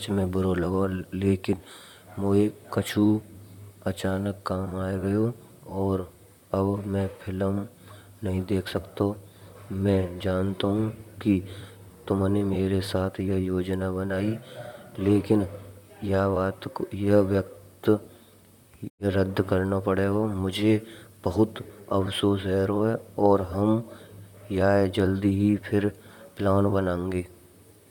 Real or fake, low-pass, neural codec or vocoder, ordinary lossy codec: fake; 19.8 kHz; vocoder, 44.1 kHz, 128 mel bands, Pupu-Vocoder; none